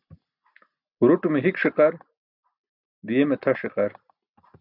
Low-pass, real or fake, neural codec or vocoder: 5.4 kHz; real; none